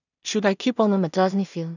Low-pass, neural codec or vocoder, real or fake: 7.2 kHz; codec, 16 kHz in and 24 kHz out, 0.4 kbps, LongCat-Audio-Codec, two codebook decoder; fake